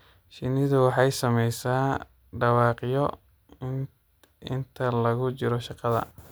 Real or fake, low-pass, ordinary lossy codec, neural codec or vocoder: real; none; none; none